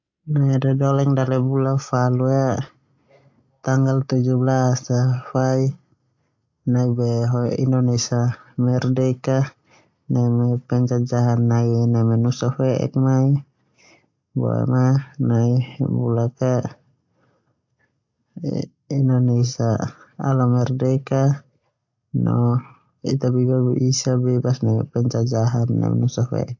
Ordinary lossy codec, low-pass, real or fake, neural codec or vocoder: AAC, 48 kbps; 7.2 kHz; real; none